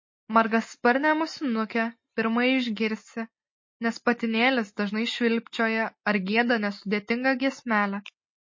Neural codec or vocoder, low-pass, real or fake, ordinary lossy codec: none; 7.2 kHz; real; MP3, 32 kbps